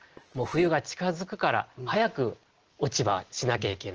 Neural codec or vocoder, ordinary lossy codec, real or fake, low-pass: none; Opus, 16 kbps; real; 7.2 kHz